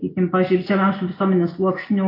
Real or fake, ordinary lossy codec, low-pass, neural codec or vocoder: real; AAC, 24 kbps; 5.4 kHz; none